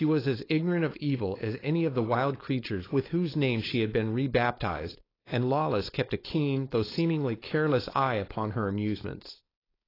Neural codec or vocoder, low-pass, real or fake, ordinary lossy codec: codec, 16 kHz, 4.8 kbps, FACodec; 5.4 kHz; fake; AAC, 24 kbps